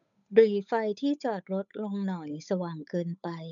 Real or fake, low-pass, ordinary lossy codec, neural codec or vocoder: fake; 7.2 kHz; none; codec, 16 kHz, 8 kbps, FunCodec, trained on Chinese and English, 25 frames a second